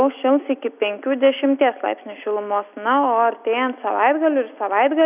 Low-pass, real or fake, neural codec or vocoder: 3.6 kHz; real; none